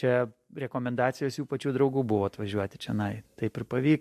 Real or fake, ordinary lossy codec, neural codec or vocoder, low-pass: real; MP3, 64 kbps; none; 14.4 kHz